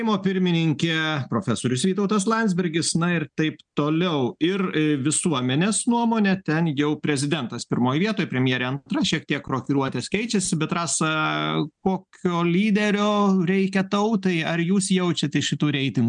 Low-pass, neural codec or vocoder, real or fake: 9.9 kHz; none; real